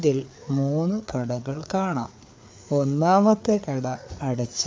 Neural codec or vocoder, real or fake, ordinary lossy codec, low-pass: codec, 16 kHz, 4 kbps, FreqCodec, larger model; fake; none; none